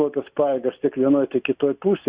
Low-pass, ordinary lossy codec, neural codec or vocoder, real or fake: 3.6 kHz; Opus, 64 kbps; none; real